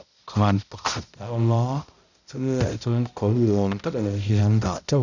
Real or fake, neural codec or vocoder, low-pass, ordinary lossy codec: fake; codec, 16 kHz, 0.5 kbps, X-Codec, HuBERT features, trained on balanced general audio; 7.2 kHz; none